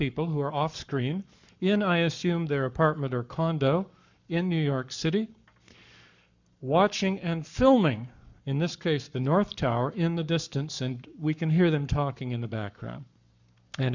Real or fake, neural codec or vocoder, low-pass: fake; codec, 44.1 kHz, 7.8 kbps, Pupu-Codec; 7.2 kHz